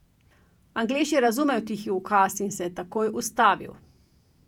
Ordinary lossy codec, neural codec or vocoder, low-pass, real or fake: none; vocoder, 48 kHz, 128 mel bands, Vocos; 19.8 kHz; fake